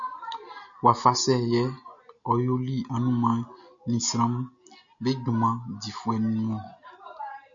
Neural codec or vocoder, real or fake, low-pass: none; real; 7.2 kHz